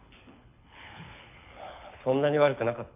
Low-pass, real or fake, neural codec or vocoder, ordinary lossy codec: 3.6 kHz; fake; codec, 24 kHz, 6 kbps, HILCodec; MP3, 32 kbps